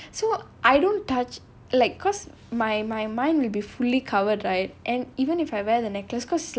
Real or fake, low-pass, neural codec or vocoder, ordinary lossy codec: real; none; none; none